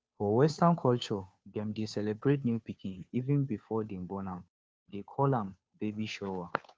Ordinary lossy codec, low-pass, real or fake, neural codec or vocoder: none; none; fake; codec, 16 kHz, 2 kbps, FunCodec, trained on Chinese and English, 25 frames a second